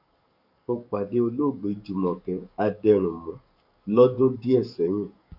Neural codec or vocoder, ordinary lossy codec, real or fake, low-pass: codec, 44.1 kHz, 7.8 kbps, DAC; none; fake; 5.4 kHz